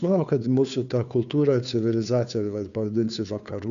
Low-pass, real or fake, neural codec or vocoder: 7.2 kHz; fake; codec, 16 kHz, 2 kbps, FunCodec, trained on Chinese and English, 25 frames a second